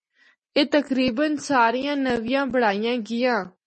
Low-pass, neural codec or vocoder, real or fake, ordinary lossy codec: 10.8 kHz; none; real; MP3, 32 kbps